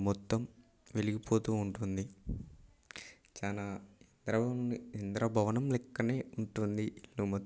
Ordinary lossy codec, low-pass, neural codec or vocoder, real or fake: none; none; none; real